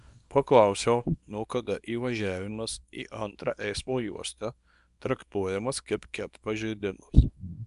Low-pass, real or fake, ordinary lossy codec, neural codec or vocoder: 10.8 kHz; fake; MP3, 96 kbps; codec, 24 kHz, 0.9 kbps, WavTokenizer, small release